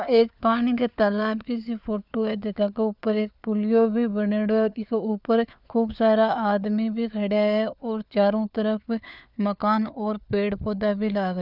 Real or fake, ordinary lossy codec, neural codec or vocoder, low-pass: fake; none; codec, 16 kHz, 4 kbps, FunCodec, trained on LibriTTS, 50 frames a second; 5.4 kHz